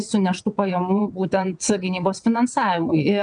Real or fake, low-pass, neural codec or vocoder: fake; 9.9 kHz; vocoder, 22.05 kHz, 80 mel bands, WaveNeXt